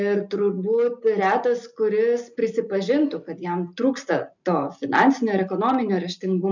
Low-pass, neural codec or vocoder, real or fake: 7.2 kHz; none; real